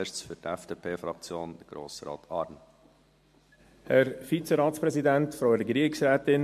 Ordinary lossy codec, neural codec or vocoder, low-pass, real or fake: MP3, 64 kbps; none; 14.4 kHz; real